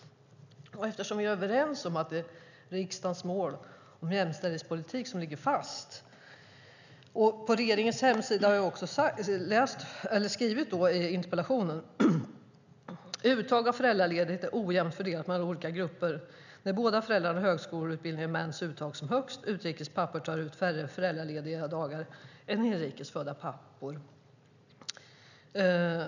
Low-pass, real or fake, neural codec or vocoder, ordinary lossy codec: 7.2 kHz; real; none; none